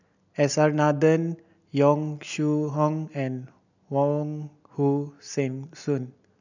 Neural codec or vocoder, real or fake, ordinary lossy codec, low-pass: none; real; none; 7.2 kHz